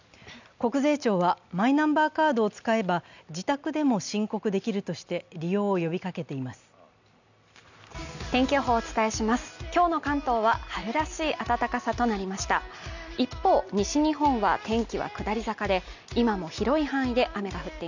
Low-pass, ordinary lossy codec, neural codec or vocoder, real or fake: 7.2 kHz; none; none; real